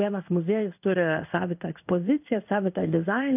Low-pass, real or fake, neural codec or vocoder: 3.6 kHz; fake; codec, 16 kHz in and 24 kHz out, 1 kbps, XY-Tokenizer